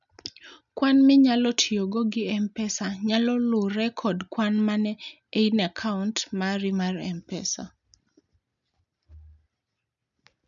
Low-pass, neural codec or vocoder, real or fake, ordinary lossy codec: 7.2 kHz; none; real; none